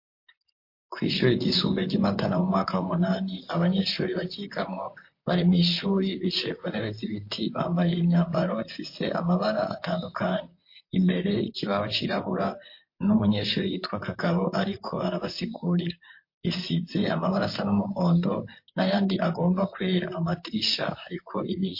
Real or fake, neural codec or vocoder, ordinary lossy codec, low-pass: fake; vocoder, 44.1 kHz, 128 mel bands, Pupu-Vocoder; MP3, 32 kbps; 5.4 kHz